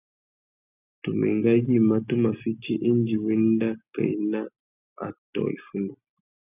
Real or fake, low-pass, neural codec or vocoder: real; 3.6 kHz; none